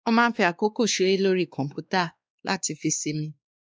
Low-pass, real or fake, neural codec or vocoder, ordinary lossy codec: none; fake; codec, 16 kHz, 2 kbps, X-Codec, WavLM features, trained on Multilingual LibriSpeech; none